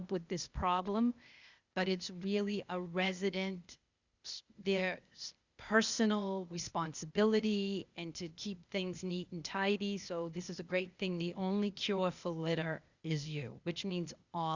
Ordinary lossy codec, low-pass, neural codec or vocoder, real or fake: Opus, 64 kbps; 7.2 kHz; codec, 16 kHz, 0.8 kbps, ZipCodec; fake